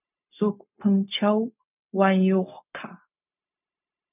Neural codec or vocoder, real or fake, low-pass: codec, 16 kHz, 0.4 kbps, LongCat-Audio-Codec; fake; 3.6 kHz